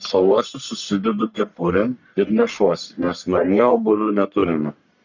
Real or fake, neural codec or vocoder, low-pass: fake; codec, 44.1 kHz, 1.7 kbps, Pupu-Codec; 7.2 kHz